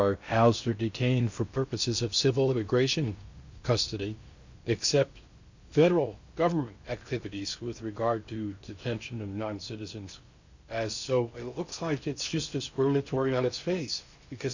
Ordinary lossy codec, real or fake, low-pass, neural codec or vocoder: Opus, 64 kbps; fake; 7.2 kHz; codec, 16 kHz in and 24 kHz out, 0.6 kbps, FocalCodec, streaming, 2048 codes